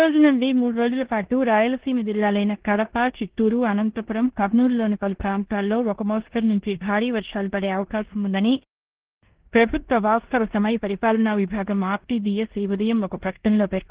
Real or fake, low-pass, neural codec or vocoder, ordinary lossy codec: fake; 3.6 kHz; codec, 16 kHz in and 24 kHz out, 0.9 kbps, LongCat-Audio-Codec, four codebook decoder; Opus, 16 kbps